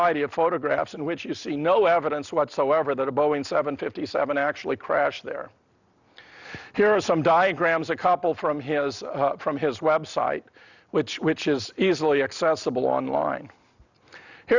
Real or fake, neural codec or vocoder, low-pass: real; none; 7.2 kHz